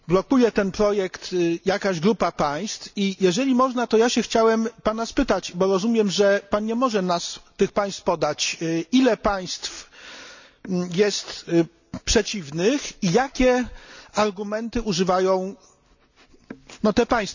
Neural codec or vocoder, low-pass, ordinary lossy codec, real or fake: none; 7.2 kHz; none; real